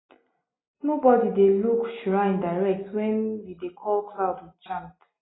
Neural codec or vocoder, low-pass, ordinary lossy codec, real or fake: none; 7.2 kHz; AAC, 16 kbps; real